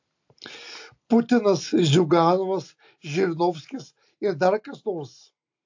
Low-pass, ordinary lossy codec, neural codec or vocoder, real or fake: 7.2 kHz; AAC, 48 kbps; none; real